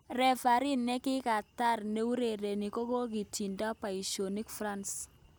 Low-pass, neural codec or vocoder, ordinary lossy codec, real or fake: none; none; none; real